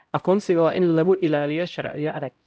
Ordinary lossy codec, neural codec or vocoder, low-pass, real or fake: none; codec, 16 kHz, 0.5 kbps, X-Codec, HuBERT features, trained on LibriSpeech; none; fake